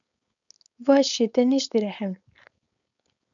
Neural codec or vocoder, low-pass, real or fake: codec, 16 kHz, 4.8 kbps, FACodec; 7.2 kHz; fake